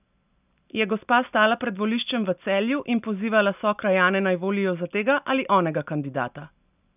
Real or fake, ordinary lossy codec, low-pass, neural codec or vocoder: real; none; 3.6 kHz; none